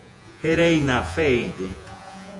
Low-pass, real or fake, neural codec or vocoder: 10.8 kHz; fake; vocoder, 48 kHz, 128 mel bands, Vocos